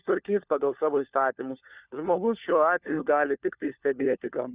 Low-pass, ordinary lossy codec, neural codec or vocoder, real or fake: 3.6 kHz; Opus, 64 kbps; codec, 16 kHz, 4 kbps, FunCodec, trained on LibriTTS, 50 frames a second; fake